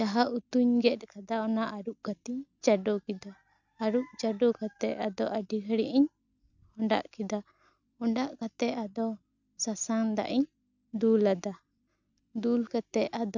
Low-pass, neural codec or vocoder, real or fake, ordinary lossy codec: 7.2 kHz; none; real; none